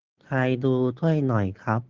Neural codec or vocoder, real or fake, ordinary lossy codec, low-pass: none; real; Opus, 16 kbps; 7.2 kHz